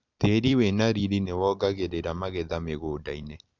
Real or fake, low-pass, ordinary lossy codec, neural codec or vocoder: fake; 7.2 kHz; none; vocoder, 44.1 kHz, 128 mel bands every 256 samples, BigVGAN v2